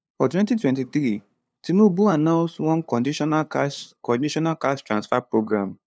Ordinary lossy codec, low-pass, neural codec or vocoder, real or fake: none; none; codec, 16 kHz, 2 kbps, FunCodec, trained on LibriTTS, 25 frames a second; fake